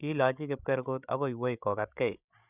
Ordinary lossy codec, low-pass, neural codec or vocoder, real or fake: none; 3.6 kHz; codec, 16 kHz, 16 kbps, FreqCodec, larger model; fake